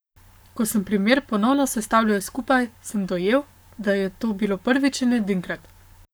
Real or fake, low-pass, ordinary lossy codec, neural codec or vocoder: fake; none; none; codec, 44.1 kHz, 7.8 kbps, Pupu-Codec